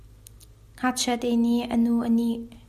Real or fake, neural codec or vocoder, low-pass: real; none; 14.4 kHz